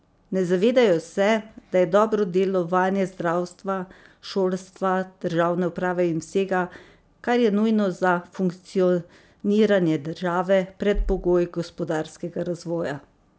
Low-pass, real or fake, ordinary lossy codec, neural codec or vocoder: none; real; none; none